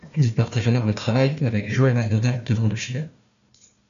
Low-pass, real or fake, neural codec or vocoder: 7.2 kHz; fake; codec, 16 kHz, 1 kbps, FunCodec, trained on Chinese and English, 50 frames a second